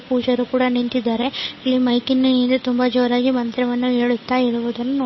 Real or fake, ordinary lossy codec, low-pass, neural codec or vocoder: fake; MP3, 24 kbps; 7.2 kHz; codec, 16 kHz, 8 kbps, FunCodec, trained on Chinese and English, 25 frames a second